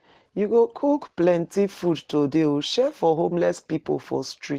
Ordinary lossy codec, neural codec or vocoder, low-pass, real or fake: Opus, 16 kbps; none; 14.4 kHz; real